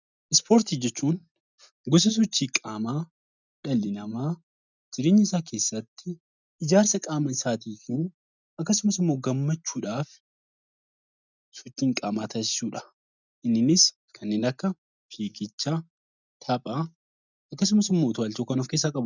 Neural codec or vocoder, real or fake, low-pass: none; real; 7.2 kHz